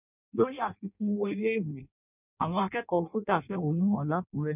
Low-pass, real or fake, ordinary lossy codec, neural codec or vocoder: 3.6 kHz; fake; none; codec, 16 kHz in and 24 kHz out, 0.6 kbps, FireRedTTS-2 codec